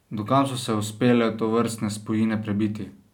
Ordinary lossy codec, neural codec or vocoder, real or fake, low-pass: none; none; real; 19.8 kHz